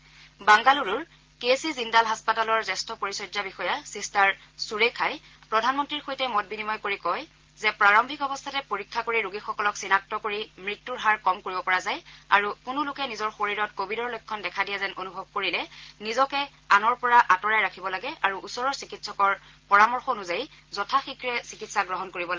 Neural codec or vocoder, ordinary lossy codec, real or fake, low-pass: none; Opus, 16 kbps; real; 7.2 kHz